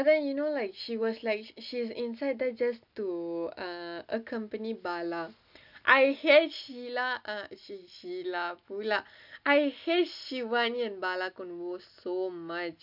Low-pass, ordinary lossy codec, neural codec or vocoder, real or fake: 5.4 kHz; none; none; real